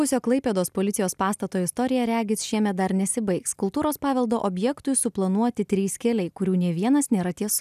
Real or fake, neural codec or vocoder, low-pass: real; none; 14.4 kHz